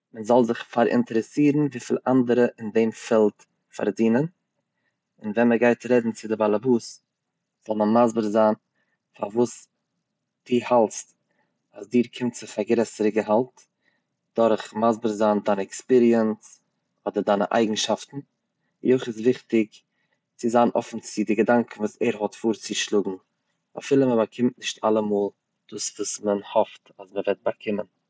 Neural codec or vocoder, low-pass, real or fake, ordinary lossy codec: none; none; real; none